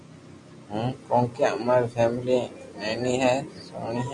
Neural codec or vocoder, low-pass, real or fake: none; 10.8 kHz; real